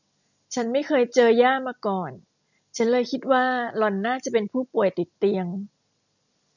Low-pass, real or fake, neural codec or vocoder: 7.2 kHz; real; none